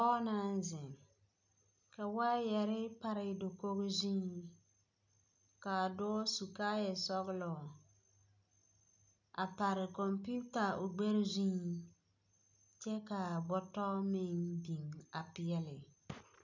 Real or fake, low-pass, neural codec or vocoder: real; 7.2 kHz; none